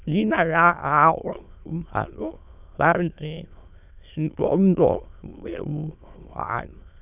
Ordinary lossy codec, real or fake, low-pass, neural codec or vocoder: none; fake; 3.6 kHz; autoencoder, 22.05 kHz, a latent of 192 numbers a frame, VITS, trained on many speakers